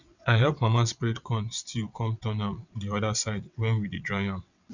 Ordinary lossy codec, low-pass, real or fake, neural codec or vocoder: none; 7.2 kHz; fake; vocoder, 22.05 kHz, 80 mel bands, WaveNeXt